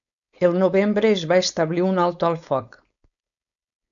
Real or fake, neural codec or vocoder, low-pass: fake; codec, 16 kHz, 4.8 kbps, FACodec; 7.2 kHz